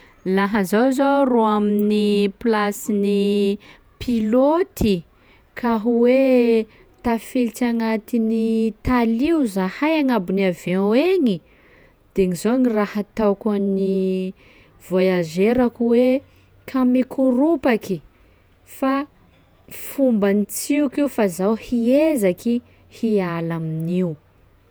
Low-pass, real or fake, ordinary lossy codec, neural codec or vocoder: none; fake; none; vocoder, 48 kHz, 128 mel bands, Vocos